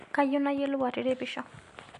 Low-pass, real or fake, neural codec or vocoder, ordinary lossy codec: 10.8 kHz; real; none; MP3, 64 kbps